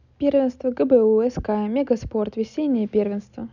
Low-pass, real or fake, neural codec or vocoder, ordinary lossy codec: 7.2 kHz; real; none; none